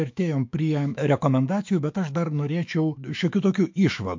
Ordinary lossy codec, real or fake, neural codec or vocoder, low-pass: MP3, 48 kbps; fake; autoencoder, 48 kHz, 128 numbers a frame, DAC-VAE, trained on Japanese speech; 7.2 kHz